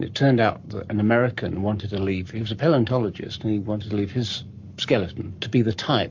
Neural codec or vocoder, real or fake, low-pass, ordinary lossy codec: codec, 44.1 kHz, 7.8 kbps, Pupu-Codec; fake; 7.2 kHz; MP3, 48 kbps